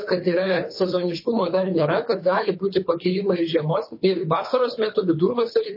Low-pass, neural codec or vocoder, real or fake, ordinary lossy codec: 5.4 kHz; codec, 24 kHz, 3 kbps, HILCodec; fake; MP3, 24 kbps